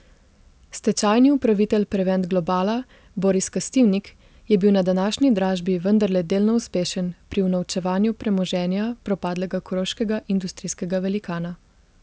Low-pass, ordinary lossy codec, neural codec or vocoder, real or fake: none; none; none; real